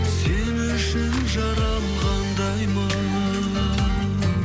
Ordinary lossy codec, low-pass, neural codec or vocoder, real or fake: none; none; none; real